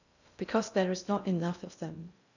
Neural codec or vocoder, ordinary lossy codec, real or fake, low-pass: codec, 16 kHz in and 24 kHz out, 0.6 kbps, FocalCodec, streaming, 2048 codes; none; fake; 7.2 kHz